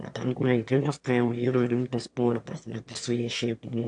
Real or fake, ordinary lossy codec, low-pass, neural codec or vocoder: fake; AAC, 64 kbps; 9.9 kHz; autoencoder, 22.05 kHz, a latent of 192 numbers a frame, VITS, trained on one speaker